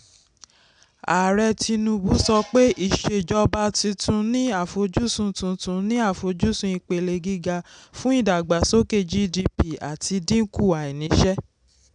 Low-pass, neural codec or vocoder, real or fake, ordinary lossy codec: 9.9 kHz; none; real; none